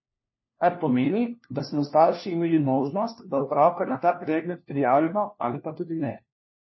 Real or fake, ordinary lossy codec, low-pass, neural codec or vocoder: fake; MP3, 24 kbps; 7.2 kHz; codec, 16 kHz, 1 kbps, FunCodec, trained on LibriTTS, 50 frames a second